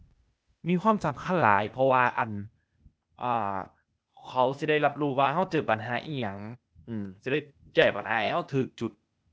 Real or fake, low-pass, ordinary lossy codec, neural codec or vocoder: fake; none; none; codec, 16 kHz, 0.8 kbps, ZipCodec